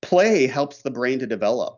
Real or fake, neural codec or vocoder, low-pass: real; none; 7.2 kHz